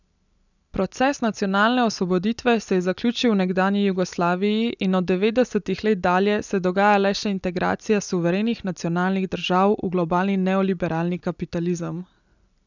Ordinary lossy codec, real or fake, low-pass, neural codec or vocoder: none; real; 7.2 kHz; none